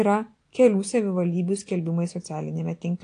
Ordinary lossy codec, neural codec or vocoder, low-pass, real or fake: AAC, 48 kbps; none; 9.9 kHz; real